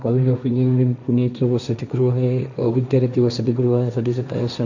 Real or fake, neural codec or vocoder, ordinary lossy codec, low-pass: fake; codec, 16 kHz, 1.1 kbps, Voila-Tokenizer; none; 7.2 kHz